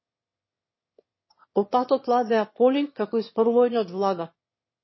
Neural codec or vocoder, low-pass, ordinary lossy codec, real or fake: autoencoder, 22.05 kHz, a latent of 192 numbers a frame, VITS, trained on one speaker; 7.2 kHz; MP3, 24 kbps; fake